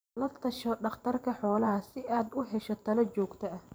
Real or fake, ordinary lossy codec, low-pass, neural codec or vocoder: fake; none; none; vocoder, 44.1 kHz, 128 mel bands every 512 samples, BigVGAN v2